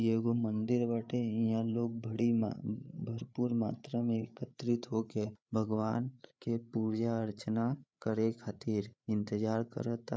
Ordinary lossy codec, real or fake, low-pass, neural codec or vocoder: none; fake; none; codec, 16 kHz, 8 kbps, FreqCodec, larger model